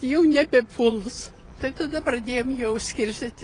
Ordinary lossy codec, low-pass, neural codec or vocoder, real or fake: AAC, 32 kbps; 9.9 kHz; vocoder, 22.05 kHz, 80 mel bands, Vocos; fake